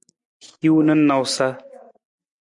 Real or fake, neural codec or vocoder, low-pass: real; none; 10.8 kHz